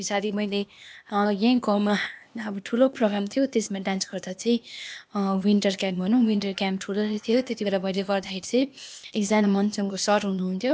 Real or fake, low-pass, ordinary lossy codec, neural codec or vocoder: fake; none; none; codec, 16 kHz, 0.8 kbps, ZipCodec